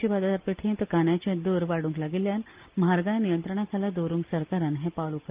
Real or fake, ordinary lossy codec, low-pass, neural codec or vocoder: real; Opus, 32 kbps; 3.6 kHz; none